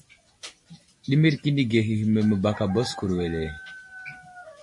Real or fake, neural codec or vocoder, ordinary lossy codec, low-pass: real; none; MP3, 48 kbps; 10.8 kHz